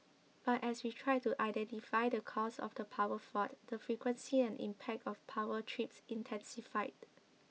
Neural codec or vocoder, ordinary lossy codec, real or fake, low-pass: none; none; real; none